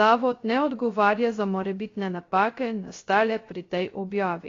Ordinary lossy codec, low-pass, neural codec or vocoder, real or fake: AAC, 32 kbps; 7.2 kHz; codec, 16 kHz, 0.3 kbps, FocalCodec; fake